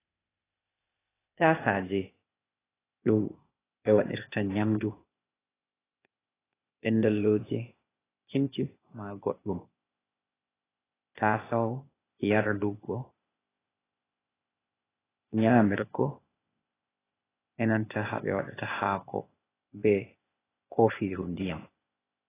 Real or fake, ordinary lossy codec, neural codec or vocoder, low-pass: fake; AAC, 16 kbps; codec, 16 kHz, 0.8 kbps, ZipCodec; 3.6 kHz